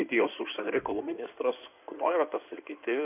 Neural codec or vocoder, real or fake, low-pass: codec, 16 kHz in and 24 kHz out, 2.2 kbps, FireRedTTS-2 codec; fake; 3.6 kHz